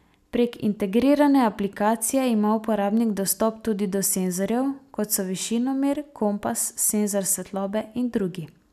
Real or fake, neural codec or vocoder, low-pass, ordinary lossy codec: real; none; 14.4 kHz; none